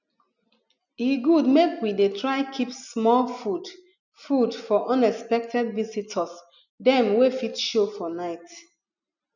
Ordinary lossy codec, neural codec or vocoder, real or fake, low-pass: none; none; real; 7.2 kHz